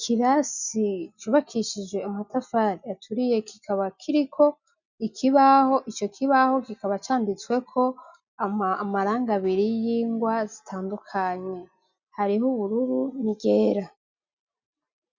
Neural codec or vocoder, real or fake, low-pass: none; real; 7.2 kHz